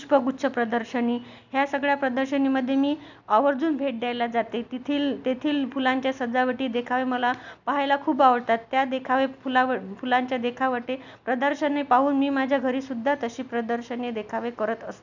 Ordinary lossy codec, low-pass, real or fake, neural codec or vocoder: none; 7.2 kHz; real; none